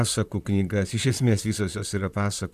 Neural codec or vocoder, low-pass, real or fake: vocoder, 44.1 kHz, 128 mel bands, Pupu-Vocoder; 14.4 kHz; fake